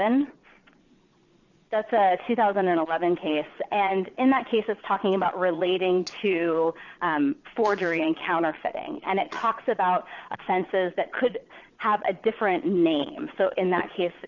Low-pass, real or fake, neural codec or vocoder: 7.2 kHz; fake; vocoder, 22.05 kHz, 80 mel bands, Vocos